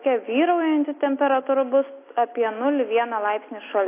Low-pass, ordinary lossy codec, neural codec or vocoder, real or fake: 3.6 kHz; AAC, 24 kbps; none; real